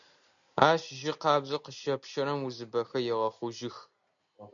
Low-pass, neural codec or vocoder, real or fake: 7.2 kHz; none; real